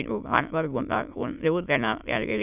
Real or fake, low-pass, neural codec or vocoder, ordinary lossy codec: fake; 3.6 kHz; autoencoder, 22.05 kHz, a latent of 192 numbers a frame, VITS, trained on many speakers; none